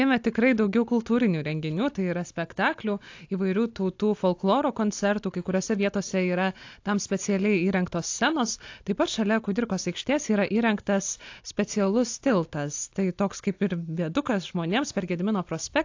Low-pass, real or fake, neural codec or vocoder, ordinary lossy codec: 7.2 kHz; real; none; AAC, 48 kbps